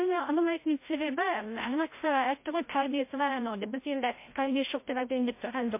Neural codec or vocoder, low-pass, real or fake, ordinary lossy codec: codec, 16 kHz, 0.5 kbps, FreqCodec, larger model; 3.6 kHz; fake; MP3, 24 kbps